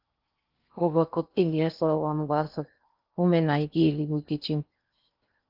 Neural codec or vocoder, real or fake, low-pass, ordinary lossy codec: codec, 16 kHz in and 24 kHz out, 0.6 kbps, FocalCodec, streaming, 2048 codes; fake; 5.4 kHz; Opus, 32 kbps